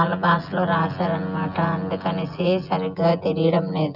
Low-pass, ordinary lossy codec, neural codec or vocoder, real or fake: 5.4 kHz; none; vocoder, 24 kHz, 100 mel bands, Vocos; fake